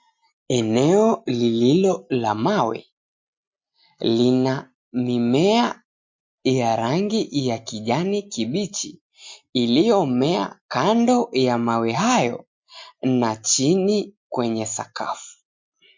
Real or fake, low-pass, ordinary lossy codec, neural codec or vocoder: real; 7.2 kHz; MP3, 48 kbps; none